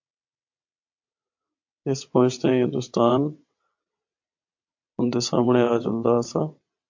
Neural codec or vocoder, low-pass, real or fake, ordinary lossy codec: vocoder, 22.05 kHz, 80 mel bands, WaveNeXt; 7.2 kHz; fake; MP3, 48 kbps